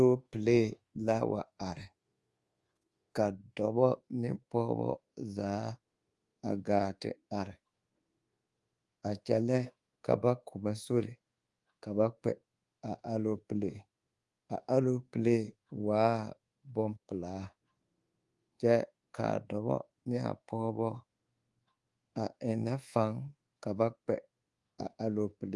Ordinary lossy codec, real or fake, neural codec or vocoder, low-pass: Opus, 24 kbps; fake; codec, 24 kHz, 1.2 kbps, DualCodec; 10.8 kHz